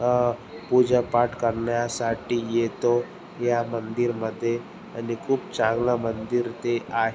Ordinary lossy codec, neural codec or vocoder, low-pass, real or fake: none; none; none; real